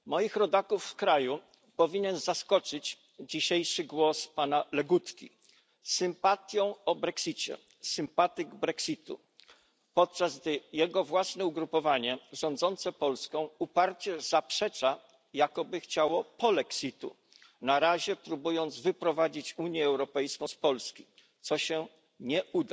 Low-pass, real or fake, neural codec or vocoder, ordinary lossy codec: none; real; none; none